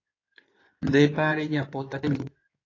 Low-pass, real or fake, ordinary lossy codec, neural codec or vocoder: 7.2 kHz; fake; AAC, 32 kbps; codec, 16 kHz in and 24 kHz out, 2.2 kbps, FireRedTTS-2 codec